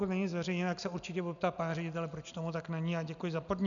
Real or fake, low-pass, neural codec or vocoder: real; 7.2 kHz; none